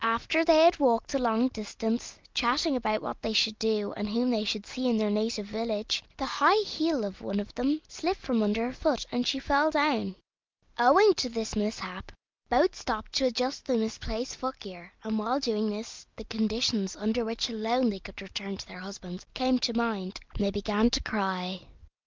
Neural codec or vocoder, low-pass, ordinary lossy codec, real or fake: none; 7.2 kHz; Opus, 24 kbps; real